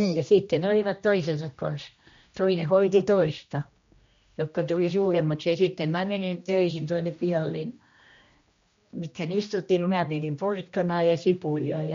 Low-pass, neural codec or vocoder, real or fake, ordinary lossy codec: 7.2 kHz; codec, 16 kHz, 1 kbps, X-Codec, HuBERT features, trained on general audio; fake; MP3, 48 kbps